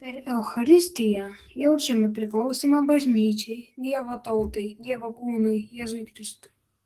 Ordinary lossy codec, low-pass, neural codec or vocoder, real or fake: Opus, 24 kbps; 14.4 kHz; codec, 44.1 kHz, 2.6 kbps, SNAC; fake